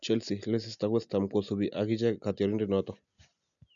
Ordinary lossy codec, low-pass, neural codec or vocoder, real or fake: none; 7.2 kHz; none; real